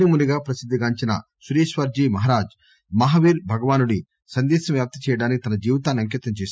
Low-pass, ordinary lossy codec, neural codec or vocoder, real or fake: none; none; none; real